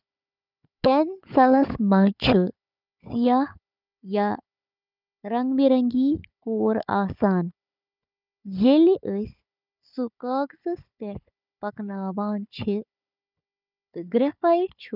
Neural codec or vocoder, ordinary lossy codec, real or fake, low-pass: codec, 16 kHz, 4 kbps, FunCodec, trained on Chinese and English, 50 frames a second; none; fake; 5.4 kHz